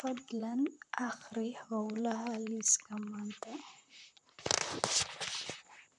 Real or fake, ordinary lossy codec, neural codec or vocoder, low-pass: fake; none; vocoder, 44.1 kHz, 128 mel bands, Pupu-Vocoder; 10.8 kHz